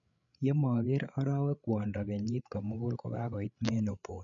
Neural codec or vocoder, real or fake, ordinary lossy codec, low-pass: codec, 16 kHz, 8 kbps, FreqCodec, larger model; fake; none; 7.2 kHz